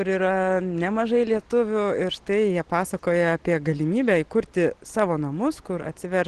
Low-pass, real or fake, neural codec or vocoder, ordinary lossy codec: 10.8 kHz; real; none; Opus, 16 kbps